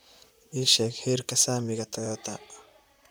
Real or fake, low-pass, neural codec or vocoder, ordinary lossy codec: fake; none; vocoder, 44.1 kHz, 128 mel bands, Pupu-Vocoder; none